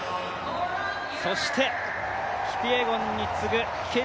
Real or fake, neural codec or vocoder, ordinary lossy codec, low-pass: real; none; none; none